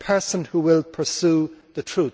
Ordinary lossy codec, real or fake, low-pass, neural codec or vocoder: none; real; none; none